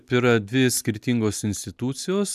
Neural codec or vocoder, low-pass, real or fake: none; 14.4 kHz; real